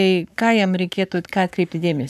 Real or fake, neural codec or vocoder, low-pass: fake; codec, 44.1 kHz, 7.8 kbps, Pupu-Codec; 14.4 kHz